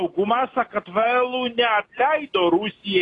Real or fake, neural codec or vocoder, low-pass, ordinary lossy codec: real; none; 10.8 kHz; AAC, 32 kbps